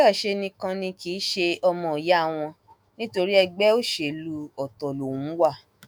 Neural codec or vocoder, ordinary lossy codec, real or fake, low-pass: autoencoder, 48 kHz, 128 numbers a frame, DAC-VAE, trained on Japanese speech; none; fake; none